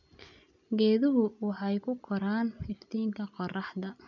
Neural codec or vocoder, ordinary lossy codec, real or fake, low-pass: none; none; real; 7.2 kHz